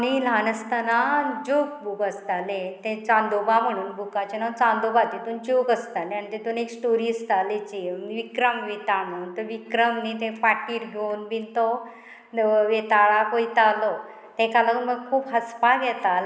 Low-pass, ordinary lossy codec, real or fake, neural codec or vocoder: none; none; real; none